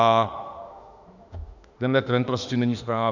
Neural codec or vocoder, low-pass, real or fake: autoencoder, 48 kHz, 32 numbers a frame, DAC-VAE, trained on Japanese speech; 7.2 kHz; fake